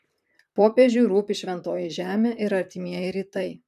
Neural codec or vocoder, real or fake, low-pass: vocoder, 44.1 kHz, 128 mel bands, Pupu-Vocoder; fake; 14.4 kHz